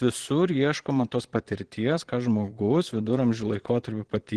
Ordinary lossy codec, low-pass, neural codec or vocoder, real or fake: Opus, 16 kbps; 9.9 kHz; vocoder, 22.05 kHz, 80 mel bands, Vocos; fake